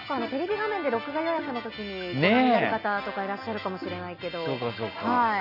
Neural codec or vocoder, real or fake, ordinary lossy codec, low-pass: none; real; none; 5.4 kHz